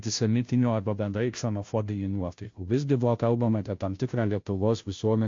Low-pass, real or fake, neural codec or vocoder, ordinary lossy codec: 7.2 kHz; fake; codec, 16 kHz, 0.5 kbps, FunCodec, trained on Chinese and English, 25 frames a second; AAC, 48 kbps